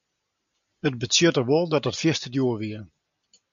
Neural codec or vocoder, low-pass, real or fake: none; 7.2 kHz; real